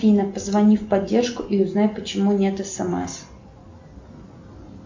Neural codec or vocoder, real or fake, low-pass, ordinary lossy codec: none; real; 7.2 kHz; MP3, 48 kbps